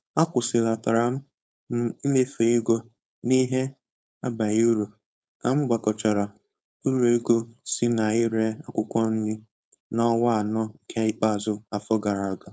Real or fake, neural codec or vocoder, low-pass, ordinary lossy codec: fake; codec, 16 kHz, 4.8 kbps, FACodec; none; none